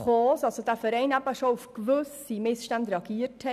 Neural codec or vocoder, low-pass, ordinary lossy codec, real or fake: none; 14.4 kHz; none; real